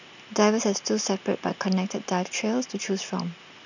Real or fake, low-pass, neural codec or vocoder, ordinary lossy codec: real; 7.2 kHz; none; none